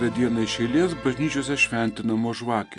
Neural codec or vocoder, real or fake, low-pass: none; real; 10.8 kHz